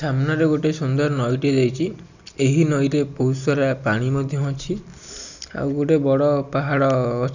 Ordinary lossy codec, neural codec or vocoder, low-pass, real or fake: none; none; 7.2 kHz; real